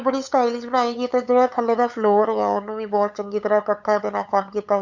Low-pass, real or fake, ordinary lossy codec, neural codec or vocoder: 7.2 kHz; fake; none; codec, 16 kHz, 8 kbps, FunCodec, trained on LibriTTS, 25 frames a second